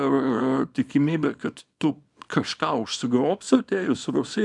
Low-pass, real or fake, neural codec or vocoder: 10.8 kHz; fake; codec, 24 kHz, 0.9 kbps, WavTokenizer, small release